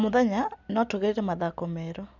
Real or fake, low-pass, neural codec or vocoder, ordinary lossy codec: real; 7.2 kHz; none; none